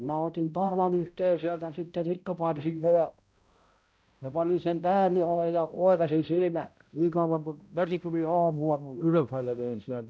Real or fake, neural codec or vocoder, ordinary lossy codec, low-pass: fake; codec, 16 kHz, 0.5 kbps, X-Codec, HuBERT features, trained on balanced general audio; none; none